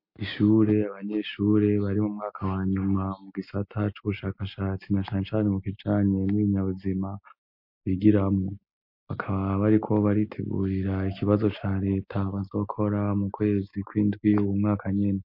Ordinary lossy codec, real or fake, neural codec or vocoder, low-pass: MP3, 32 kbps; real; none; 5.4 kHz